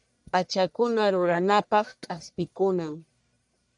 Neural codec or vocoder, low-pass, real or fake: codec, 44.1 kHz, 1.7 kbps, Pupu-Codec; 10.8 kHz; fake